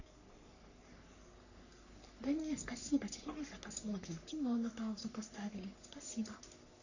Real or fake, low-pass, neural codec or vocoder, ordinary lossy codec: fake; 7.2 kHz; codec, 44.1 kHz, 3.4 kbps, Pupu-Codec; none